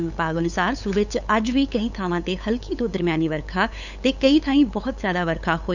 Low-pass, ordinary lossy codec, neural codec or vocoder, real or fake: 7.2 kHz; none; codec, 16 kHz, 8 kbps, FunCodec, trained on LibriTTS, 25 frames a second; fake